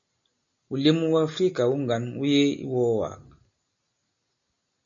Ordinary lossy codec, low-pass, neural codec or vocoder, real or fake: MP3, 64 kbps; 7.2 kHz; none; real